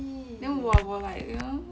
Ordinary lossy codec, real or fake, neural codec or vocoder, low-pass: none; real; none; none